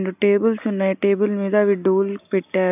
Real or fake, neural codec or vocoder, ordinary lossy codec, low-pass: real; none; none; 3.6 kHz